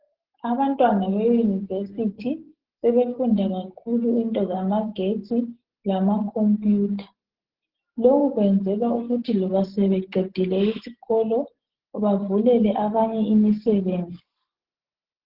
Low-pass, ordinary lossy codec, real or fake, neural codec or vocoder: 5.4 kHz; Opus, 16 kbps; real; none